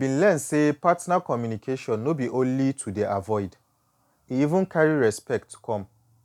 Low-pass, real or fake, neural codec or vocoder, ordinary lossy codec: 19.8 kHz; real; none; none